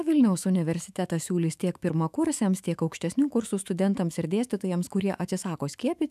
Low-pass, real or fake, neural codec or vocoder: 14.4 kHz; fake; autoencoder, 48 kHz, 128 numbers a frame, DAC-VAE, trained on Japanese speech